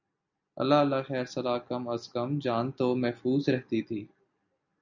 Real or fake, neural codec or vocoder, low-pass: real; none; 7.2 kHz